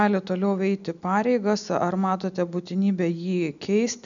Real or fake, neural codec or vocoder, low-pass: real; none; 7.2 kHz